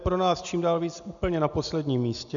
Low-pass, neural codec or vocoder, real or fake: 7.2 kHz; none; real